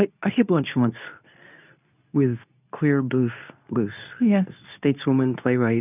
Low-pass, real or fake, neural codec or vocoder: 3.6 kHz; fake; codec, 24 kHz, 0.9 kbps, WavTokenizer, medium speech release version 2